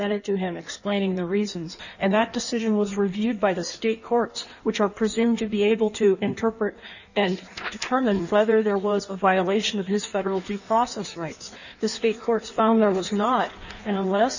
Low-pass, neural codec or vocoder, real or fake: 7.2 kHz; codec, 16 kHz in and 24 kHz out, 1.1 kbps, FireRedTTS-2 codec; fake